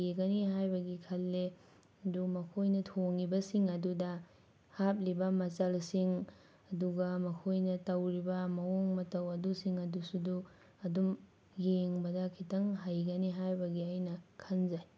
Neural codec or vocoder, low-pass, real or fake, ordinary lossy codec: none; none; real; none